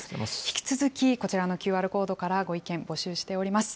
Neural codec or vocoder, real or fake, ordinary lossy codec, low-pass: none; real; none; none